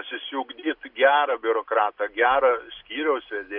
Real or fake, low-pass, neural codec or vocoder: real; 5.4 kHz; none